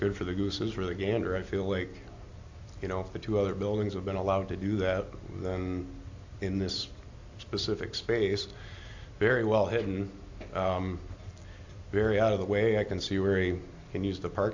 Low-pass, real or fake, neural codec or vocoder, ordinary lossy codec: 7.2 kHz; real; none; AAC, 48 kbps